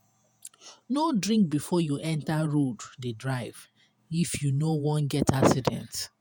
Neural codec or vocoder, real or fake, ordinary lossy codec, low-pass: vocoder, 48 kHz, 128 mel bands, Vocos; fake; none; none